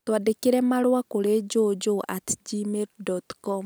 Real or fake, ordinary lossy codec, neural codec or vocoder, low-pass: real; none; none; none